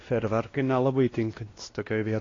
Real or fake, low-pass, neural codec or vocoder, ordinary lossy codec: fake; 7.2 kHz; codec, 16 kHz, 1 kbps, X-Codec, WavLM features, trained on Multilingual LibriSpeech; AAC, 32 kbps